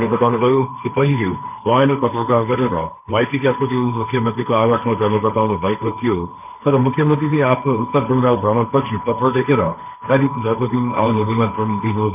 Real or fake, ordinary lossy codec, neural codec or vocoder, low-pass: fake; none; codec, 16 kHz, 1.1 kbps, Voila-Tokenizer; 3.6 kHz